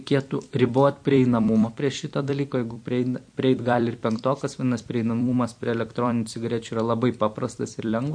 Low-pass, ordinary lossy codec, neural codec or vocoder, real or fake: 9.9 kHz; MP3, 48 kbps; vocoder, 44.1 kHz, 128 mel bands every 256 samples, BigVGAN v2; fake